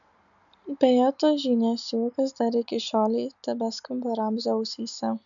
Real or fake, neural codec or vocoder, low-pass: real; none; 7.2 kHz